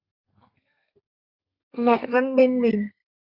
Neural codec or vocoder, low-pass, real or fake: codec, 32 kHz, 1.9 kbps, SNAC; 5.4 kHz; fake